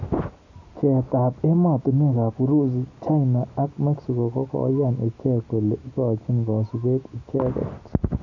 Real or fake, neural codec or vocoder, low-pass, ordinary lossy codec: real; none; 7.2 kHz; none